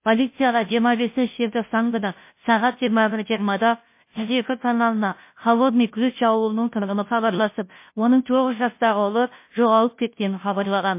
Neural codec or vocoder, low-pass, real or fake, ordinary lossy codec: codec, 16 kHz, 0.5 kbps, FunCodec, trained on Chinese and English, 25 frames a second; 3.6 kHz; fake; MP3, 24 kbps